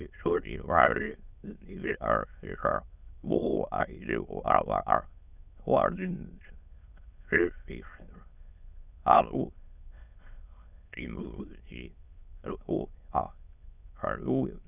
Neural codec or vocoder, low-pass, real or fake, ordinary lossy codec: autoencoder, 22.05 kHz, a latent of 192 numbers a frame, VITS, trained on many speakers; 3.6 kHz; fake; none